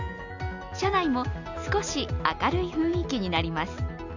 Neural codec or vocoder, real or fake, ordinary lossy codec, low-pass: none; real; none; 7.2 kHz